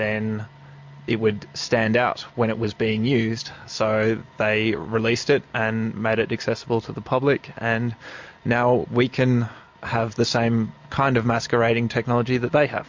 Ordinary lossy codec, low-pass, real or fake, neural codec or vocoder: MP3, 48 kbps; 7.2 kHz; real; none